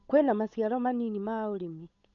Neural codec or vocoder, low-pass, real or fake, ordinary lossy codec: codec, 16 kHz, 8 kbps, FunCodec, trained on Chinese and English, 25 frames a second; 7.2 kHz; fake; none